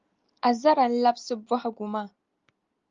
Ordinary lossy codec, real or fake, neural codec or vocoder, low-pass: Opus, 32 kbps; real; none; 7.2 kHz